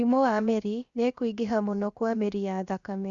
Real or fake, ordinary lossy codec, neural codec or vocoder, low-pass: fake; Opus, 64 kbps; codec, 16 kHz, 0.7 kbps, FocalCodec; 7.2 kHz